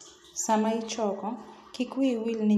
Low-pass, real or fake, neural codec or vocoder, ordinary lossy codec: 14.4 kHz; real; none; none